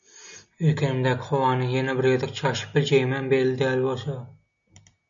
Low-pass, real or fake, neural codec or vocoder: 7.2 kHz; real; none